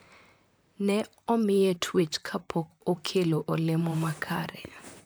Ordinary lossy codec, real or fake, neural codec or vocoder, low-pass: none; fake; vocoder, 44.1 kHz, 128 mel bands, Pupu-Vocoder; none